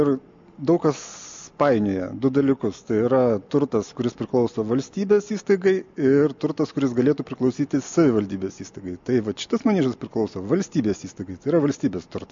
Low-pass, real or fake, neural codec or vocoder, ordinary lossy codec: 7.2 kHz; real; none; MP3, 48 kbps